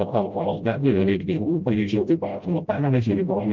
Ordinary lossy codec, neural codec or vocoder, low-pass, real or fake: Opus, 24 kbps; codec, 16 kHz, 0.5 kbps, FreqCodec, smaller model; 7.2 kHz; fake